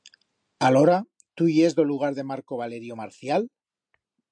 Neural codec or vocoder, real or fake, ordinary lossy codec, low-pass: none; real; MP3, 64 kbps; 9.9 kHz